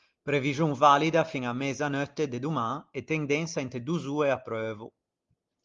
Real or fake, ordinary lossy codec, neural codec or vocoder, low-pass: real; Opus, 32 kbps; none; 7.2 kHz